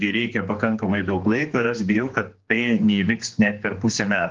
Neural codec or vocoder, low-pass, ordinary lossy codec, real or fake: codec, 16 kHz, 2 kbps, X-Codec, HuBERT features, trained on general audio; 7.2 kHz; Opus, 32 kbps; fake